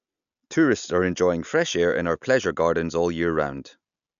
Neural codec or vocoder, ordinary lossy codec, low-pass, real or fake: none; none; 7.2 kHz; real